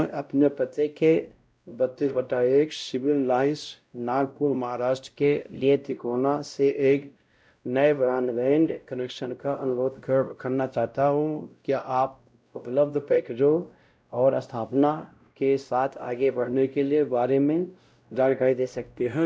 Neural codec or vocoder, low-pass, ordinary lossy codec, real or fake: codec, 16 kHz, 0.5 kbps, X-Codec, WavLM features, trained on Multilingual LibriSpeech; none; none; fake